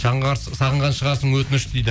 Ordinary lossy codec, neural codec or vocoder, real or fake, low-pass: none; none; real; none